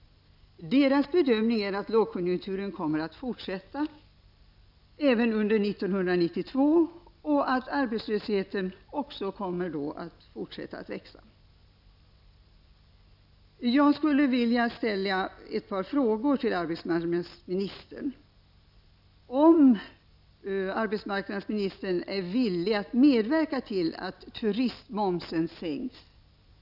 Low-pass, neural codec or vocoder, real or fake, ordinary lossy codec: 5.4 kHz; none; real; none